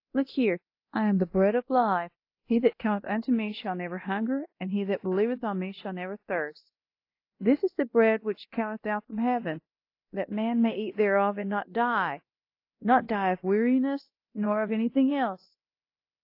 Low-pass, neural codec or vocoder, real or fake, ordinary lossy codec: 5.4 kHz; codec, 24 kHz, 0.9 kbps, DualCodec; fake; AAC, 32 kbps